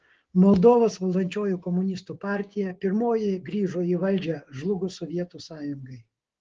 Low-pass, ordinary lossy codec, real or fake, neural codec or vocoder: 7.2 kHz; Opus, 16 kbps; fake; codec, 16 kHz, 6 kbps, DAC